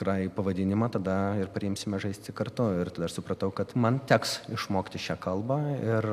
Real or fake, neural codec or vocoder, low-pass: real; none; 14.4 kHz